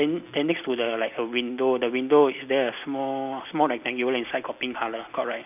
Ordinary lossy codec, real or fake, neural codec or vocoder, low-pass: none; real; none; 3.6 kHz